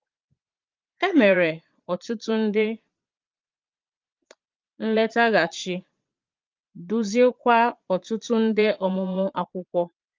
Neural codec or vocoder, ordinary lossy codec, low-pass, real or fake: vocoder, 22.05 kHz, 80 mel bands, Vocos; Opus, 24 kbps; 7.2 kHz; fake